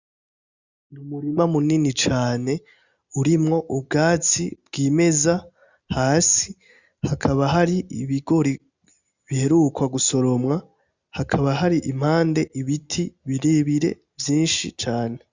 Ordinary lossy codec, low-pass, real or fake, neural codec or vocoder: Opus, 64 kbps; 7.2 kHz; real; none